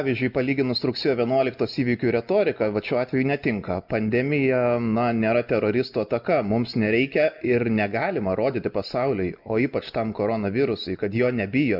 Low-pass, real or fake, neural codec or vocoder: 5.4 kHz; real; none